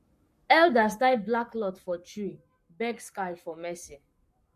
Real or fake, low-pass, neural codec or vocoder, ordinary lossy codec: fake; 14.4 kHz; codec, 44.1 kHz, 7.8 kbps, Pupu-Codec; MP3, 64 kbps